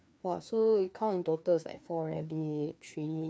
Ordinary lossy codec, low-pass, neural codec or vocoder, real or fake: none; none; codec, 16 kHz, 2 kbps, FreqCodec, larger model; fake